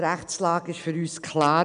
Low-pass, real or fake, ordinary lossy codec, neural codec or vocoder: 9.9 kHz; real; none; none